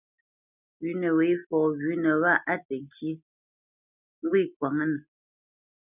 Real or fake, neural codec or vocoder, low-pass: real; none; 3.6 kHz